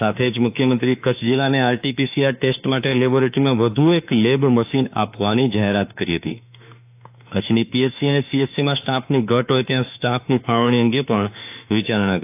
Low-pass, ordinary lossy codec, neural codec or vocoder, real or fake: 3.6 kHz; AAC, 32 kbps; autoencoder, 48 kHz, 32 numbers a frame, DAC-VAE, trained on Japanese speech; fake